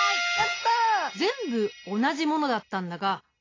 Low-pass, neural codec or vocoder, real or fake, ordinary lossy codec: 7.2 kHz; none; real; AAC, 32 kbps